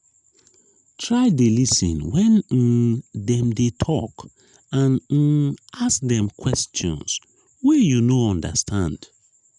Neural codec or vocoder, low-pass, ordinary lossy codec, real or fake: none; 10.8 kHz; none; real